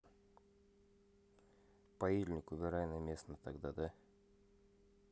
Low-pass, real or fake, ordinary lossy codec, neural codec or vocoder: none; real; none; none